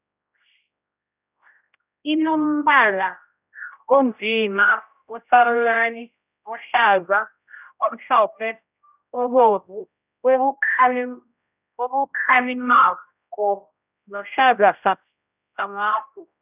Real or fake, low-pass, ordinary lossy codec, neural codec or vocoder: fake; 3.6 kHz; none; codec, 16 kHz, 0.5 kbps, X-Codec, HuBERT features, trained on general audio